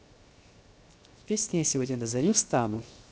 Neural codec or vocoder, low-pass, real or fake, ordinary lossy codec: codec, 16 kHz, 0.7 kbps, FocalCodec; none; fake; none